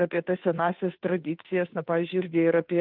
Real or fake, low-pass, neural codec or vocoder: fake; 5.4 kHz; codec, 16 kHz, 2 kbps, FunCodec, trained on Chinese and English, 25 frames a second